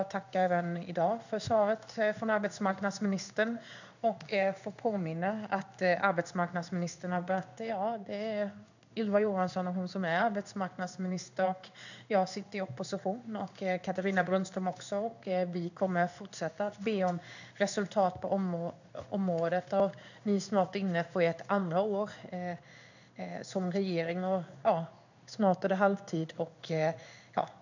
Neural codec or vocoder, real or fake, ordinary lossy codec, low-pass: codec, 16 kHz in and 24 kHz out, 1 kbps, XY-Tokenizer; fake; none; 7.2 kHz